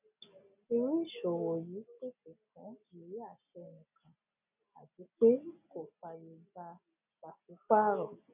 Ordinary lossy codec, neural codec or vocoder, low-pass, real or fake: none; none; 3.6 kHz; real